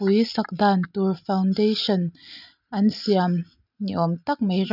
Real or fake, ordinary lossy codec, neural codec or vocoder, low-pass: real; none; none; 5.4 kHz